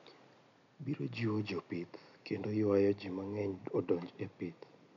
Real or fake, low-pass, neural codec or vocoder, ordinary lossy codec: real; 7.2 kHz; none; none